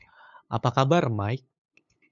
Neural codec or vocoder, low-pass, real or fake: codec, 16 kHz, 8 kbps, FunCodec, trained on LibriTTS, 25 frames a second; 7.2 kHz; fake